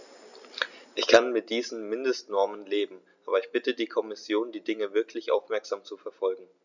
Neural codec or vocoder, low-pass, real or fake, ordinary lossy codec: none; 7.2 kHz; real; none